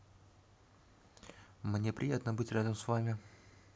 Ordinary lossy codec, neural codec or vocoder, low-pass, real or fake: none; none; none; real